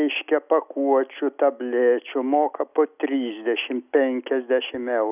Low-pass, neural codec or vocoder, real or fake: 3.6 kHz; none; real